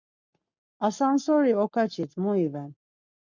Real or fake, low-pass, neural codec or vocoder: fake; 7.2 kHz; codec, 44.1 kHz, 7.8 kbps, Pupu-Codec